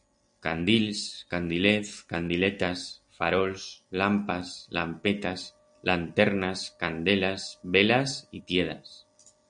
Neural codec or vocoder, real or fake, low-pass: none; real; 9.9 kHz